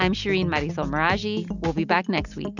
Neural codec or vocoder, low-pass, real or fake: none; 7.2 kHz; real